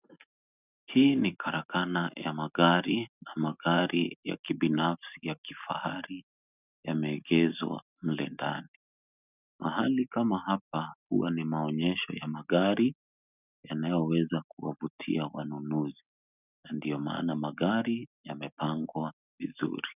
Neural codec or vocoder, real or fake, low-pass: none; real; 3.6 kHz